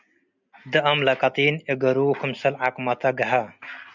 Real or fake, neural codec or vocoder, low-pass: real; none; 7.2 kHz